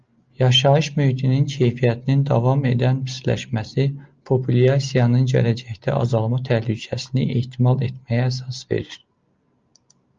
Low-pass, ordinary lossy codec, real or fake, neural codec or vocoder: 7.2 kHz; Opus, 24 kbps; real; none